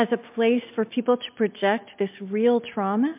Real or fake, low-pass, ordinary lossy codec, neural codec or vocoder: real; 3.6 kHz; MP3, 32 kbps; none